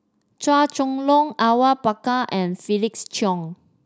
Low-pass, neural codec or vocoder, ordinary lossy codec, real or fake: none; none; none; real